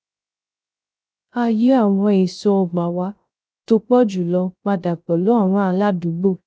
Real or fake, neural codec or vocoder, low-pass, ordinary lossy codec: fake; codec, 16 kHz, 0.3 kbps, FocalCodec; none; none